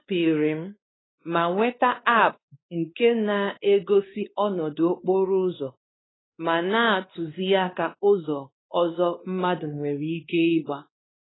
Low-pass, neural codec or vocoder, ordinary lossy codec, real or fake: 7.2 kHz; codec, 16 kHz, 2 kbps, X-Codec, WavLM features, trained on Multilingual LibriSpeech; AAC, 16 kbps; fake